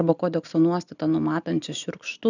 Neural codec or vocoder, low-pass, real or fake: none; 7.2 kHz; real